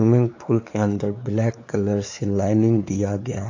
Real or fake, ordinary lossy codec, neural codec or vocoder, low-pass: fake; AAC, 48 kbps; codec, 16 kHz, 4 kbps, X-Codec, WavLM features, trained on Multilingual LibriSpeech; 7.2 kHz